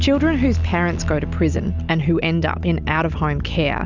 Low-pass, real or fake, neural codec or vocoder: 7.2 kHz; real; none